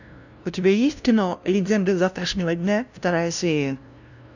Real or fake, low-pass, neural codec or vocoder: fake; 7.2 kHz; codec, 16 kHz, 0.5 kbps, FunCodec, trained on LibriTTS, 25 frames a second